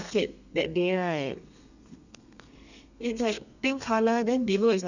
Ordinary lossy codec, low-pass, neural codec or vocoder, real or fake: none; 7.2 kHz; codec, 32 kHz, 1.9 kbps, SNAC; fake